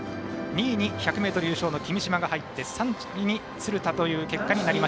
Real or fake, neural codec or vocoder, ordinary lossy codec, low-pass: real; none; none; none